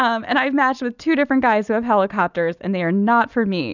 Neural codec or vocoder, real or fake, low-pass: none; real; 7.2 kHz